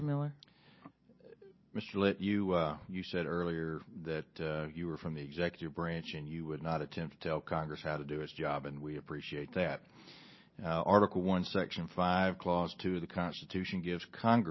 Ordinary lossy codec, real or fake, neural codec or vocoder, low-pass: MP3, 24 kbps; real; none; 7.2 kHz